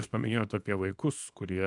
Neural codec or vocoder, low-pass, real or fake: codec, 24 kHz, 0.9 kbps, WavTokenizer, small release; 10.8 kHz; fake